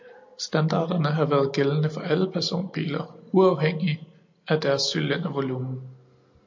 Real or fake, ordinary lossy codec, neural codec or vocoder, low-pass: real; MP3, 48 kbps; none; 7.2 kHz